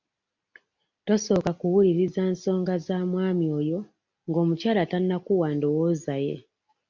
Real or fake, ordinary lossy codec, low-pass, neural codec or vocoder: real; MP3, 64 kbps; 7.2 kHz; none